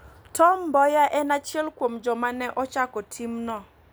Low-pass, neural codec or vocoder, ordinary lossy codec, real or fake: none; none; none; real